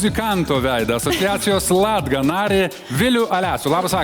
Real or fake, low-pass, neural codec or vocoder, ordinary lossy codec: real; 19.8 kHz; none; Opus, 64 kbps